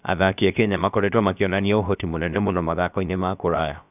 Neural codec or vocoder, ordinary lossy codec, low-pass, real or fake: codec, 16 kHz, 0.7 kbps, FocalCodec; none; 3.6 kHz; fake